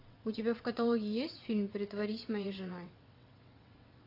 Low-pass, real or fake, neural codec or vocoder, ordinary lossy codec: 5.4 kHz; fake; vocoder, 22.05 kHz, 80 mel bands, WaveNeXt; AAC, 32 kbps